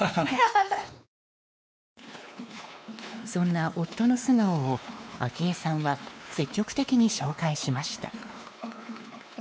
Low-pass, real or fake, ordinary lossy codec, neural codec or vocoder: none; fake; none; codec, 16 kHz, 2 kbps, X-Codec, WavLM features, trained on Multilingual LibriSpeech